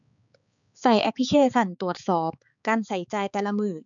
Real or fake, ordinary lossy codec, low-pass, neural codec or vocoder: fake; none; 7.2 kHz; codec, 16 kHz, 4 kbps, X-Codec, HuBERT features, trained on balanced general audio